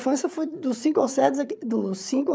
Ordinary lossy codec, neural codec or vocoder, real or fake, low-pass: none; codec, 16 kHz, 8 kbps, FreqCodec, larger model; fake; none